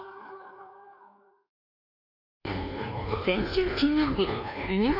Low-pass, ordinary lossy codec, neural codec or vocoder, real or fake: 5.4 kHz; none; codec, 24 kHz, 1.2 kbps, DualCodec; fake